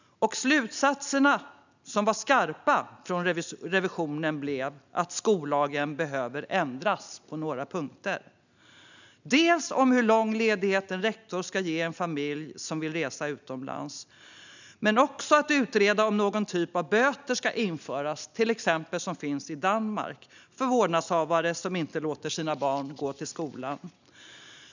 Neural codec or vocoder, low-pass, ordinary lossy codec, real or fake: none; 7.2 kHz; none; real